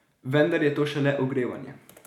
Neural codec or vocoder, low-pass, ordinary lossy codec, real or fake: none; 19.8 kHz; none; real